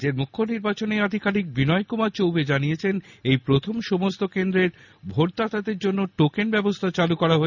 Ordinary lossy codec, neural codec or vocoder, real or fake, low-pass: none; none; real; 7.2 kHz